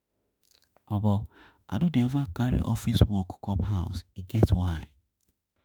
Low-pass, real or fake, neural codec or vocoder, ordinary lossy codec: none; fake; autoencoder, 48 kHz, 32 numbers a frame, DAC-VAE, trained on Japanese speech; none